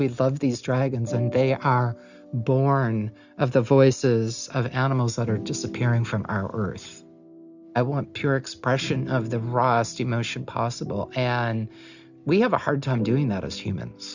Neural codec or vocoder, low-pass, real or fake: none; 7.2 kHz; real